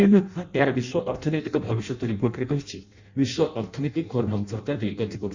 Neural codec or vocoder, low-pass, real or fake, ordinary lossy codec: codec, 16 kHz in and 24 kHz out, 0.6 kbps, FireRedTTS-2 codec; 7.2 kHz; fake; Opus, 64 kbps